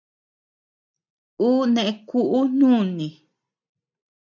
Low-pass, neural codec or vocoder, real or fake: 7.2 kHz; none; real